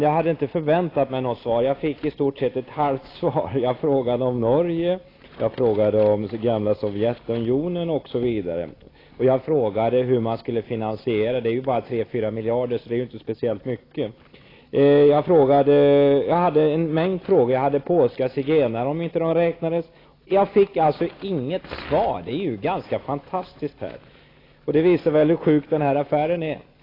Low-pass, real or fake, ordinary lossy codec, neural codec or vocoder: 5.4 kHz; real; AAC, 24 kbps; none